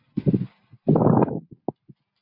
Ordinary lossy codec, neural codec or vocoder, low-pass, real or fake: MP3, 32 kbps; none; 5.4 kHz; real